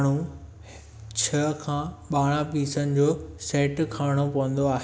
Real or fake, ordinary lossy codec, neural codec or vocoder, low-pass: real; none; none; none